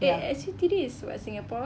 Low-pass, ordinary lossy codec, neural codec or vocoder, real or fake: none; none; none; real